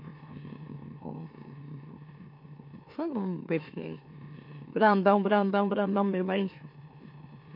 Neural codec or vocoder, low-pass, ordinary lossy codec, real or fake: autoencoder, 44.1 kHz, a latent of 192 numbers a frame, MeloTTS; 5.4 kHz; MP3, 32 kbps; fake